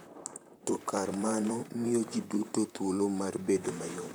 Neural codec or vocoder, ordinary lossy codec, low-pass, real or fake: vocoder, 44.1 kHz, 128 mel bands, Pupu-Vocoder; none; none; fake